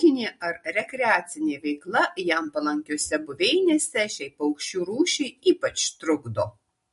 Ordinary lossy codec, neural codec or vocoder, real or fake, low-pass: MP3, 48 kbps; none; real; 14.4 kHz